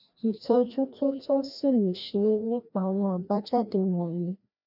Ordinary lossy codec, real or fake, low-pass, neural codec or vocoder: none; fake; 5.4 kHz; codec, 16 kHz, 1 kbps, FreqCodec, larger model